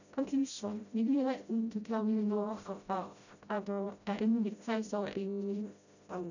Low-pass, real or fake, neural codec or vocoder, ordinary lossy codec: 7.2 kHz; fake; codec, 16 kHz, 0.5 kbps, FreqCodec, smaller model; none